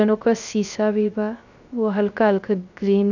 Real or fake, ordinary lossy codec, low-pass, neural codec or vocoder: fake; none; 7.2 kHz; codec, 16 kHz, 0.3 kbps, FocalCodec